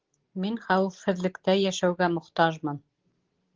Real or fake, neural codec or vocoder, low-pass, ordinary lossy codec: real; none; 7.2 kHz; Opus, 16 kbps